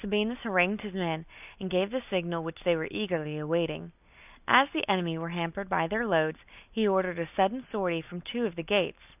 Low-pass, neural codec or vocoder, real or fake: 3.6 kHz; none; real